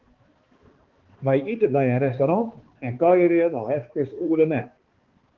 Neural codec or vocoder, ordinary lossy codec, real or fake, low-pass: codec, 16 kHz, 2 kbps, X-Codec, HuBERT features, trained on balanced general audio; Opus, 16 kbps; fake; 7.2 kHz